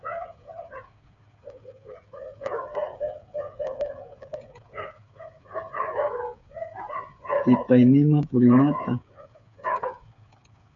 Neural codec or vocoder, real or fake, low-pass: codec, 16 kHz, 8 kbps, FreqCodec, smaller model; fake; 7.2 kHz